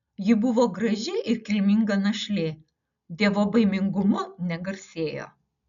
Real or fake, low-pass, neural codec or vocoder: real; 7.2 kHz; none